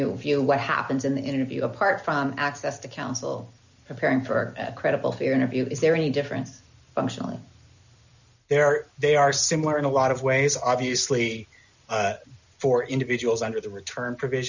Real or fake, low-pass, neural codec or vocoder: real; 7.2 kHz; none